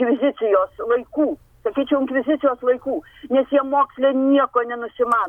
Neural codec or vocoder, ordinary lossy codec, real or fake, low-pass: none; MP3, 96 kbps; real; 19.8 kHz